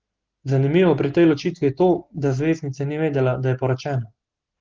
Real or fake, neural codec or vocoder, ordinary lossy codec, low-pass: real; none; Opus, 16 kbps; 7.2 kHz